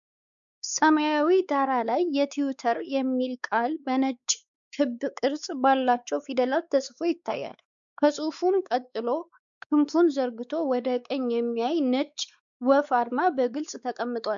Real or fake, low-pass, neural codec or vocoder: fake; 7.2 kHz; codec, 16 kHz, 4 kbps, X-Codec, WavLM features, trained on Multilingual LibriSpeech